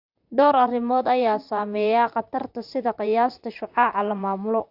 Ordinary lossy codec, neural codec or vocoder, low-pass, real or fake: AAC, 48 kbps; vocoder, 44.1 kHz, 128 mel bands, Pupu-Vocoder; 5.4 kHz; fake